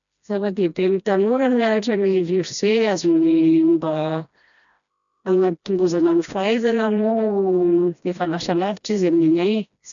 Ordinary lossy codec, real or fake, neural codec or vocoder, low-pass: none; fake; codec, 16 kHz, 1 kbps, FreqCodec, smaller model; 7.2 kHz